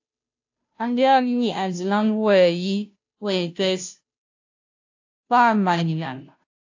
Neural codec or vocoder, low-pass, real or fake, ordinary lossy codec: codec, 16 kHz, 0.5 kbps, FunCodec, trained on Chinese and English, 25 frames a second; 7.2 kHz; fake; AAC, 48 kbps